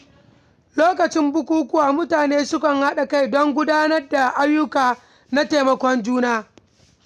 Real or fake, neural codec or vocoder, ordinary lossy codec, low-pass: real; none; none; 14.4 kHz